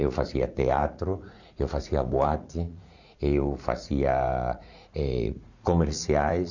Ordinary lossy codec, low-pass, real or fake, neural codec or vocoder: none; 7.2 kHz; fake; vocoder, 44.1 kHz, 80 mel bands, Vocos